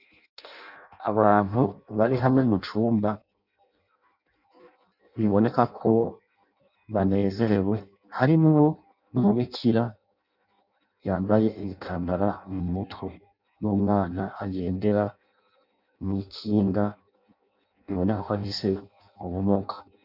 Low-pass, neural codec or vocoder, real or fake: 5.4 kHz; codec, 16 kHz in and 24 kHz out, 0.6 kbps, FireRedTTS-2 codec; fake